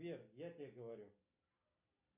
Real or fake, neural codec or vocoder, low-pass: real; none; 3.6 kHz